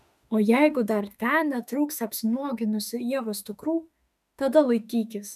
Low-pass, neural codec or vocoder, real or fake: 14.4 kHz; autoencoder, 48 kHz, 32 numbers a frame, DAC-VAE, trained on Japanese speech; fake